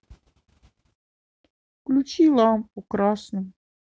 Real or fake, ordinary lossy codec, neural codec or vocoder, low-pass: real; none; none; none